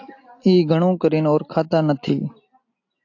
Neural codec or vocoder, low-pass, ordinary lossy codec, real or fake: none; 7.2 kHz; AAC, 48 kbps; real